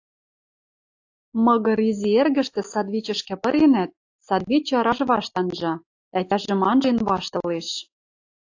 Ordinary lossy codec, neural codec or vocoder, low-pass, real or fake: AAC, 48 kbps; none; 7.2 kHz; real